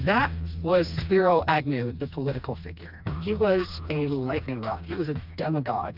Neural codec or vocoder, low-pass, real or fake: codec, 16 kHz, 2 kbps, FreqCodec, smaller model; 5.4 kHz; fake